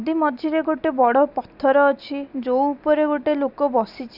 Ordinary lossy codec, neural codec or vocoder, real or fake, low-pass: none; none; real; 5.4 kHz